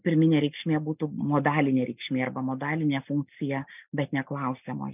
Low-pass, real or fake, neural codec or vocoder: 3.6 kHz; real; none